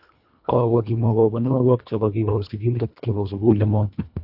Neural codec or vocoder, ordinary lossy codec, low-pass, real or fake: codec, 24 kHz, 1.5 kbps, HILCodec; AAC, 48 kbps; 5.4 kHz; fake